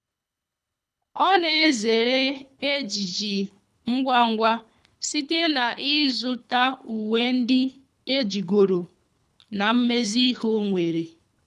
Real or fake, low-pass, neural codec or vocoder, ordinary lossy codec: fake; none; codec, 24 kHz, 3 kbps, HILCodec; none